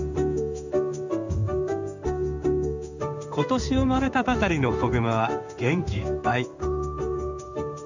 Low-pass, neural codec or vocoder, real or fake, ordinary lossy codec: 7.2 kHz; codec, 16 kHz in and 24 kHz out, 1 kbps, XY-Tokenizer; fake; none